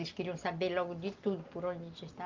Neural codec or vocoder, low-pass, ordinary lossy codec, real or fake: none; 7.2 kHz; Opus, 32 kbps; real